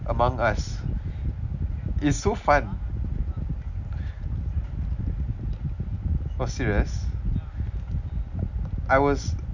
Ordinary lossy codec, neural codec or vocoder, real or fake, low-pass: none; none; real; 7.2 kHz